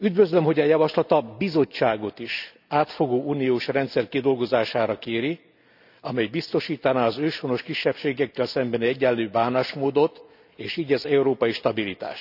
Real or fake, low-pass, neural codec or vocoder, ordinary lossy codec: real; 5.4 kHz; none; none